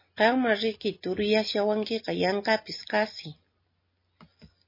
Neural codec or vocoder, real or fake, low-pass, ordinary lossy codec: none; real; 5.4 kHz; MP3, 24 kbps